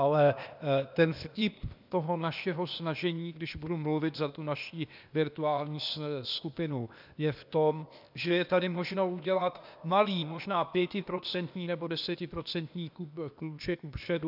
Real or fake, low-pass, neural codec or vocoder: fake; 5.4 kHz; codec, 16 kHz, 0.8 kbps, ZipCodec